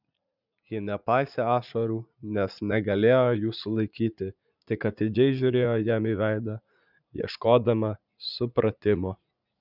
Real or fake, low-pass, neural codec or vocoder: fake; 5.4 kHz; vocoder, 44.1 kHz, 80 mel bands, Vocos